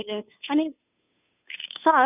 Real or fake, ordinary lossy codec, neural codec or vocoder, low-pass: fake; none; codec, 16 kHz, 2 kbps, FunCodec, trained on Chinese and English, 25 frames a second; 3.6 kHz